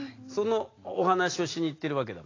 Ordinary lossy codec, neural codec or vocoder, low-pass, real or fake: AAC, 32 kbps; none; 7.2 kHz; real